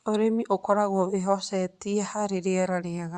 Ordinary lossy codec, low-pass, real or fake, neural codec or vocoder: Opus, 64 kbps; 10.8 kHz; fake; vocoder, 24 kHz, 100 mel bands, Vocos